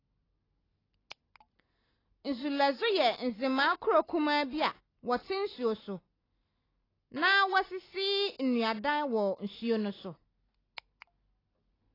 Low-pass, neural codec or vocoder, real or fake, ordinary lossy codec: 5.4 kHz; none; real; AAC, 24 kbps